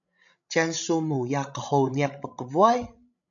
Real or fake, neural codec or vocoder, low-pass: fake; codec, 16 kHz, 16 kbps, FreqCodec, larger model; 7.2 kHz